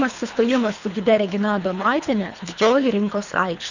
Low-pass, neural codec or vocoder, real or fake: 7.2 kHz; codec, 24 kHz, 3 kbps, HILCodec; fake